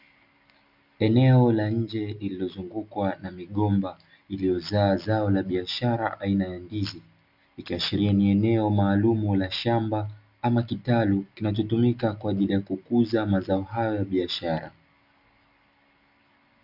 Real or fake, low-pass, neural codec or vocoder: real; 5.4 kHz; none